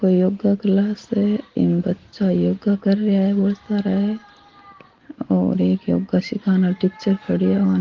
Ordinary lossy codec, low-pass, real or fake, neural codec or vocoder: Opus, 16 kbps; 7.2 kHz; real; none